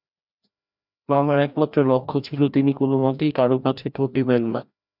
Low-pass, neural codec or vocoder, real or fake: 5.4 kHz; codec, 16 kHz, 1 kbps, FreqCodec, larger model; fake